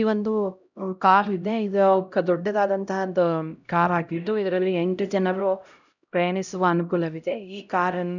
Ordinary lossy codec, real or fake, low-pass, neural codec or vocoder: none; fake; 7.2 kHz; codec, 16 kHz, 0.5 kbps, X-Codec, HuBERT features, trained on LibriSpeech